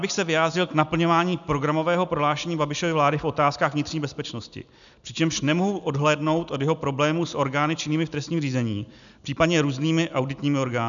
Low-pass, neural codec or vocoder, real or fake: 7.2 kHz; none; real